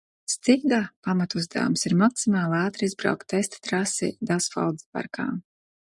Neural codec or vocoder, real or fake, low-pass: none; real; 10.8 kHz